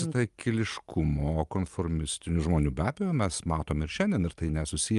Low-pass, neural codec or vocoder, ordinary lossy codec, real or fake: 10.8 kHz; none; Opus, 32 kbps; real